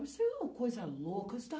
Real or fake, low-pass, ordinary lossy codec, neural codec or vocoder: real; none; none; none